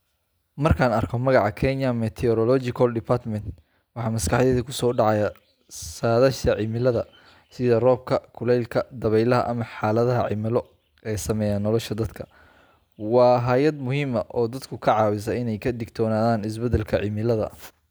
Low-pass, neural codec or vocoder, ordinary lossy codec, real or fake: none; none; none; real